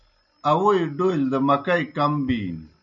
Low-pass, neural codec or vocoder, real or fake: 7.2 kHz; none; real